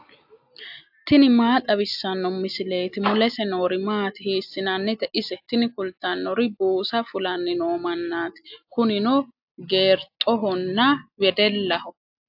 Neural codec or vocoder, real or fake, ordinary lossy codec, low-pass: none; real; AAC, 48 kbps; 5.4 kHz